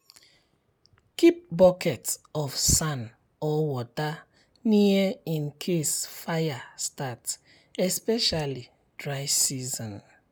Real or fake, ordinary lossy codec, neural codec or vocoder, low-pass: real; none; none; none